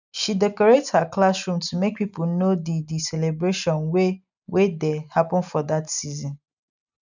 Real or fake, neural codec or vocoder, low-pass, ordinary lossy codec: real; none; 7.2 kHz; none